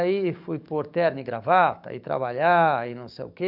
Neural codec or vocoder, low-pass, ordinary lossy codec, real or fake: codec, 44.1 kHz, 7.8 kbps, DAC; 5.4 kHz; none; fake